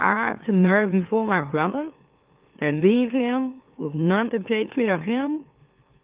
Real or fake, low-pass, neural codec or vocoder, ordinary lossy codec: fake; 3.6 kHz; autoencoder, 44.1 kHz, a latent of 192 numbers a frame, MeloTTS; Opus, 24 kbps